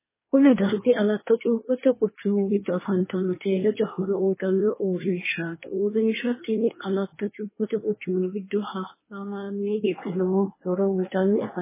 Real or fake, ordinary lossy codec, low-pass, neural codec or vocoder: fake; MP3, 16 kbps; 3.6 kHz; codec, 24 kHz, 1 kbps, SNAC